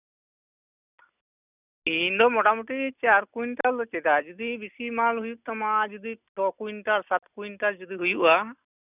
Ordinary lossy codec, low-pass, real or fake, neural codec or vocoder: none; 3.6 kHz; real; none